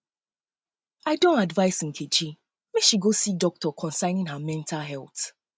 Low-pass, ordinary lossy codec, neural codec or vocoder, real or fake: none; none; none; real